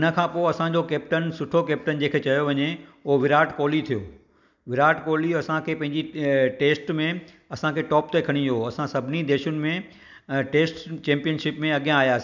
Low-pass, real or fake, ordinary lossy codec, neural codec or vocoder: 7.2 kHz; real; none; none